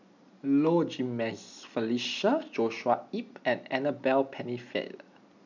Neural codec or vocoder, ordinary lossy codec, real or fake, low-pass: none; none; real; 7.2 kHz